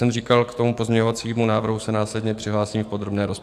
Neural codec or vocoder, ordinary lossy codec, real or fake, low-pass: codec, 44.1 kHz, 7.8 kbps, DAC; AAC, 96 kbps; fake; 14.4 kHz